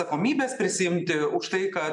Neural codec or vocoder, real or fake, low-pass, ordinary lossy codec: none; real; 10.8 kHz; MP3, 96 kbps